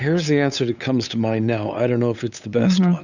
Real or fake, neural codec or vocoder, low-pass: fake; codec, 16 kHz, 8 kbps, FunCodec, trained on LibriTTS, 25 frames a second; 7.2 kHz